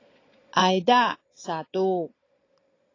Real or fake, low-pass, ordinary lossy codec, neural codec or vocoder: fake; 7.2 kHz; AAC, 32 kbps; vocoder, 22.05 kHz, 80 mel bands, Vocos